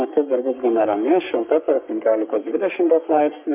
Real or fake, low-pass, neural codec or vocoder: fake; 3.6 kHz; codec, 44.1 kHz, 3.4 kbps, Pupu-Codec